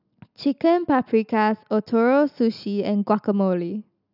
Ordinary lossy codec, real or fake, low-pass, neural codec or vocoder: none; real; 5.4 kHz; none